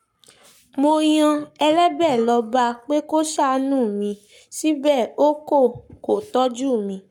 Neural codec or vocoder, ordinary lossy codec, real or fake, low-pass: codec, 44.1 kHz, 7.8 kbps, Pupu-Codec; none; fake; 19.8 kHz